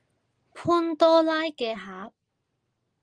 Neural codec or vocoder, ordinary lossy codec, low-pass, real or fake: none; Opus, 24 kbps; 9.9 kHz; real